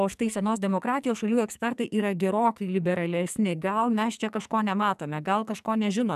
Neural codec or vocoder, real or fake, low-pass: codec, 44.1 kHz, 2.6 kbps, SNAC; fake; 14.4 kHz